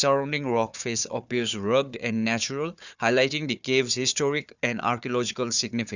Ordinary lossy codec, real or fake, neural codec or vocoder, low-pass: none; fake; codec, 16 kHz, 4 kbps, FunCodec, trained on Chinese and English, 50 frames a second; 7.2 kHz